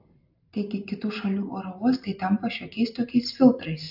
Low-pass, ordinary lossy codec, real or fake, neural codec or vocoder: 5.4 kHz; AAC, 48 kbps; real; none